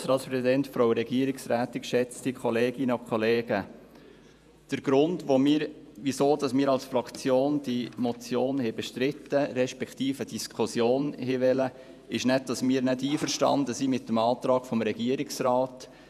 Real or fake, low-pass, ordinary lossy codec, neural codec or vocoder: fake; 14.4 kHz; none; vocoder, 48 kHz, 128 mel bands, Vocos